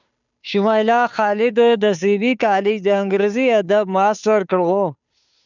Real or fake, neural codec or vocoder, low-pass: fake; codec, 16 kHz, 2 kbps, FunCodec, trained on Chinese and English, 25 frames a second; 7.2 kHz